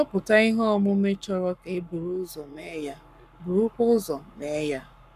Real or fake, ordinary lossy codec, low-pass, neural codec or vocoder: fake; none; 14.4 kHz; codec, 44.1 kHz, 7.8 kbps, Pupu-Codec